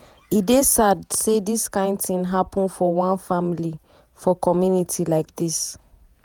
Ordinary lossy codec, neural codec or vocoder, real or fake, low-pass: none; vocoder, 48 kHz, 128 mel bands, Vocos; fake; none